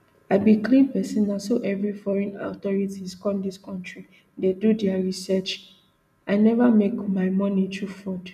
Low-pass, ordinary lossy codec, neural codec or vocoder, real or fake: 14.4 kHz; none; none; real